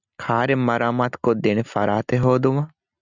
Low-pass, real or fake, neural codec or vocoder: 7.2 kHz; real; none